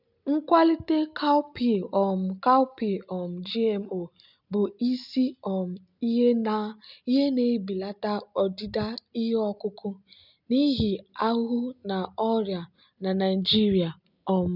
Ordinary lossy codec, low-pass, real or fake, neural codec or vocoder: none; 5.4 kHz; real; none